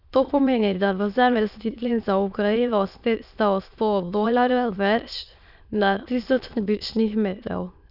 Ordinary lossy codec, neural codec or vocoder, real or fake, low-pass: none; autoencoder, 22.05 kHz, a latent of 192 numbers a frame, VITS, trained on many speakers; fake; 5.4 kHz